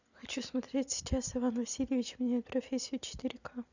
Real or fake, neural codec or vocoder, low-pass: real; none; 7.2 kHz